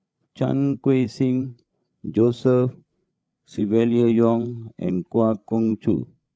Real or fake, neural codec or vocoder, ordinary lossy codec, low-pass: fake; codec, 16 kHz, 8 kbps, FreqCodec, larger model; none; none